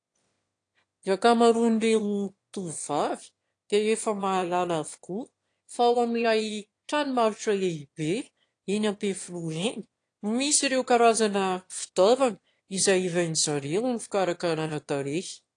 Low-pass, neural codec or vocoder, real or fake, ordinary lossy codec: 9.9 kHz; autoencoder, 22.05 kHz, a latent of 192 numbers a frame, VITS, trained on one speaker; fake; AAC, 48 kbps